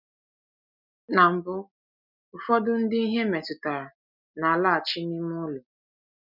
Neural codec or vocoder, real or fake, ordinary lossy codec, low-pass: none; real; none; 5.4 kHz